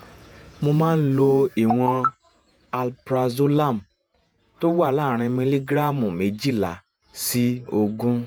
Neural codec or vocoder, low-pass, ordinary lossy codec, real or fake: vocoder, 48 kHz, 128 mel bands, Vocos; none; none; fake